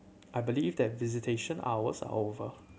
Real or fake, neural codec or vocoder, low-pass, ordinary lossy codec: real; none; none; none